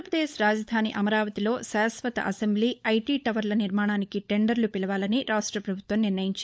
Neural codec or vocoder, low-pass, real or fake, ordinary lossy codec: codec, 16 kHz, 16 kbps, FunCodec, trained on LibriTTS, 50 frames a second; none; fake; none